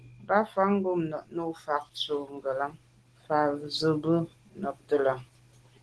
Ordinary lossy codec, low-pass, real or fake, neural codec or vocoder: Opus, 16 kbps; 10.8 kHz; real; none